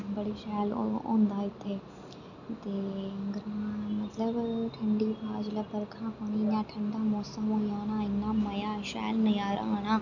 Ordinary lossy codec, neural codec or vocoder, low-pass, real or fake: none; none; 7.2 kHz; real